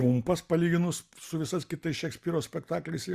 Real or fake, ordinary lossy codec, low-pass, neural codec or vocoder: real; Opus, 64 kbps; 14.4 kHz; none